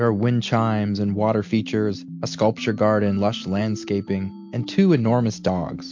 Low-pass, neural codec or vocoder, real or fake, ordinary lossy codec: 7.2 kHz; none; real; MP3, 48 kbps